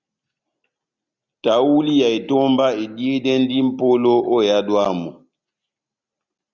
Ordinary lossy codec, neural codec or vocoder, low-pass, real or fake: Opus, 64 kbps; none; 7.2 kHz; real